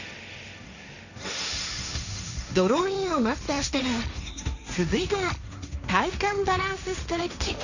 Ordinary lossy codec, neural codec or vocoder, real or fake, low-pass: none; codec, 16 kHz, 1.1 kbps, Voila-Tokenizer; fake; 7.2 kHz